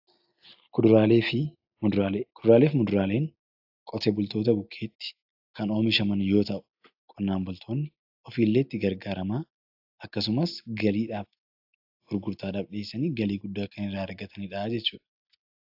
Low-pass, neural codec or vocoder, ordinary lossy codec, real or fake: 5.4 kHz; none; AAC, 48 kbps; real